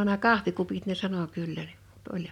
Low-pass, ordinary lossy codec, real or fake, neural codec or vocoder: 19.8 kHz; none; real; none